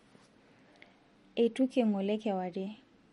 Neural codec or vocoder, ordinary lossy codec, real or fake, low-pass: none; MP3, 48 kbps; real; 19.8 kHz